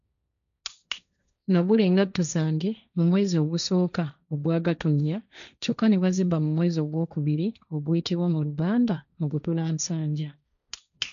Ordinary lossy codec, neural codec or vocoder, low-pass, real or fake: none; codec, 16 kHz, 1.1 kbps, Voila-Tokenizer; 7.2 kHz; fake